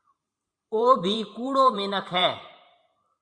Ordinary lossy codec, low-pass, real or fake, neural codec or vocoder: Opus, 64 kbps; 9.9 kHz; fake; vocoder, 44.1 kHz, 128 mel bands every 512 samples, BigVGAN v2